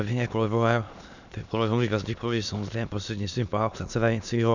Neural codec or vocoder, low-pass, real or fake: autoencoder, 22.05 kHz, a latent of 192 numbers a frame, VITS, trained on many speakers; 7.2 kHz; fake